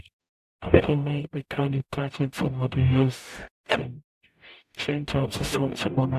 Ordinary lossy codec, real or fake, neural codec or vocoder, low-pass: none; fake; codec, 44.1 kHz, 0.9 kbps, DAC; 14.4 kHz